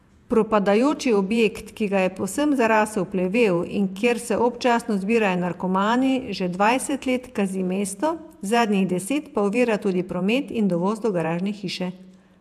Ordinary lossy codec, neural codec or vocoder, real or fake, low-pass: AAC, 96 kbps; vocoder, 48 kHz, 128 mel bands, Vocos; fake; 14.4 kHz